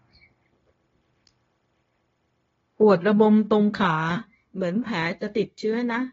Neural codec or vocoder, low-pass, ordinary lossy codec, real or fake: codec, 16 kHz, 0.9 kbps, LongCat-Audio-Codec; 7.2 kHz; AAC, 24 kbps; fake